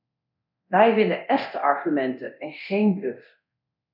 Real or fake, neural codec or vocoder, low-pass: fake; codec, 24 kHz, 0.9 kbps, DualCodec; 5.4 kHz